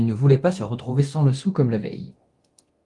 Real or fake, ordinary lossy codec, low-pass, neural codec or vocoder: fake; Opus, 24 kbps; 10.8 kHz; codec, 24 kHz, 0.9 kbps, DualCodec